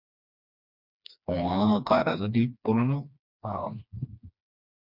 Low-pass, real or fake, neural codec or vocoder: 5.4 kHz; fake; codec, 16 kHz, 2 kbps, FreqCodec, smaller model